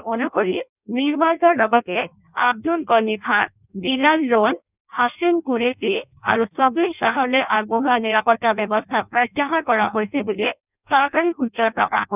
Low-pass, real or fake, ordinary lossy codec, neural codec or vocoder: 3.6 kHz; fake; none; codec, 16 kHz in and 24 kHz out, 0.6 kbps, FireRedTTS-2 codec